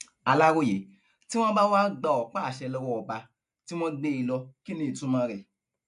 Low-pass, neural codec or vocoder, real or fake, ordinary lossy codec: 10.8 kHz; none; real; MP3, 64 kbps